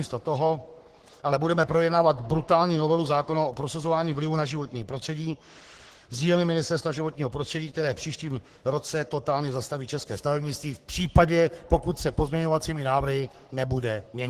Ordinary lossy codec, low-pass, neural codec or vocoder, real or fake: Opus, 16 kbps; 14.4 kHz; codec, 44.1 kHz, 3.4 kbps, Pupu-Codec; fake